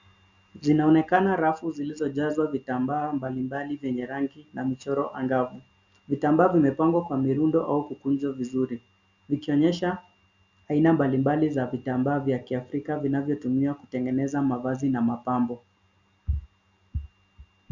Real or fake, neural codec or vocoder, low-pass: real; none; 7.2 kHz